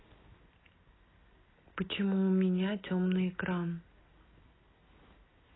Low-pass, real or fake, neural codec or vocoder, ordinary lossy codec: 7.2 kHz; real; none; AAC, 16 kbps